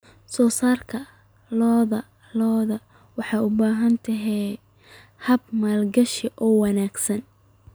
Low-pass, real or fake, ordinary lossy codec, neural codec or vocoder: none; real; none; none